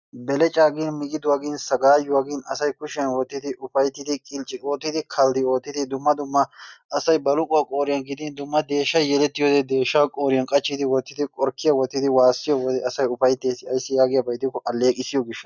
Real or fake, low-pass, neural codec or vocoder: real; 7.2 kHz; none